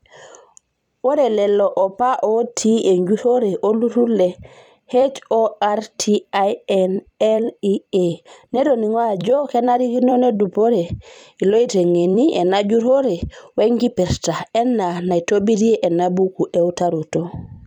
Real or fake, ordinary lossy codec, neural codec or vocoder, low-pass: fake; none; vocoder, 44.1 kHz, 128 mel bands every 256 samples, BigVGAN v2; 19.8 kHz